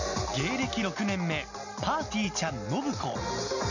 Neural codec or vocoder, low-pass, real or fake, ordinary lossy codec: none; 7.2 kHz; real; none